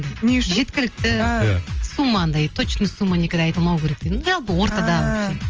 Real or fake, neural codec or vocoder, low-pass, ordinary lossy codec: real; none; 7.2 kHz; Opus, 24 kbps